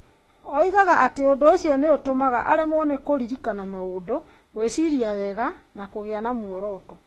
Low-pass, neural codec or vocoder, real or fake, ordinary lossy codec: 19.8 kHz; autoencoder, 48 kHz, 32 numbers a frame, DAC-VAE, trained on Japanese speech; fake; AAC, 32 kbps